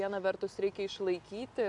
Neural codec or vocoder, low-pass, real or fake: none; 10.8 kHz; real